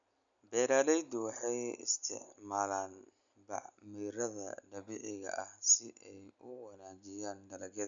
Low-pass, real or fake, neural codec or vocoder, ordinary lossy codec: 7.2 kHz; real; none; none